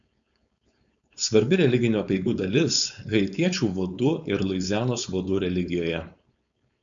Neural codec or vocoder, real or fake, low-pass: codec, 16 kHz, 4.8 kbps, FACodec; fake; 7.2 kHz